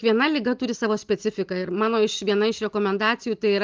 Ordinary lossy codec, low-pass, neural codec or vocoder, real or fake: Opus, 32 kbps; 7.2 kHz; none; real